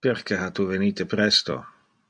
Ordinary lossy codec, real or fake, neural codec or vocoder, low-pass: MP3, 64 kbps; real; none; 9.9 kHz